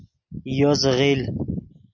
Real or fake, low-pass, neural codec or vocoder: real; 7.2 kHz; none